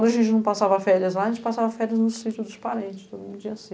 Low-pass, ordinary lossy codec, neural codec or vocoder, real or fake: none; none; none; real